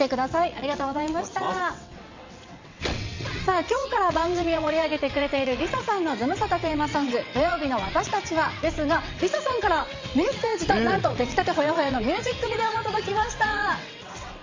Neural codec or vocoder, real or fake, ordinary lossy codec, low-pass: vocoder, 22.05 kHz, 80 mel bands, WaveNeXt; fake; MP3, 48 kbps; 7.2 kHz